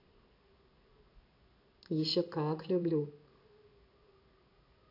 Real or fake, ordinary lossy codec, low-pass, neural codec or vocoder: fake; AAC, 48 kbps; 5.4 kHz; codec, 16 kHz in and 24 kHz out, 1 kbps, XY-Tokenizer